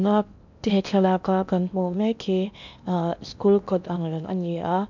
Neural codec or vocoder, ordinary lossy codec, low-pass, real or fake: codec, 16 kHz in and 24 kHz out, 0.8 kbps, FocalCodec, streaming, 65536 codes; none; 7.2 kHz; fake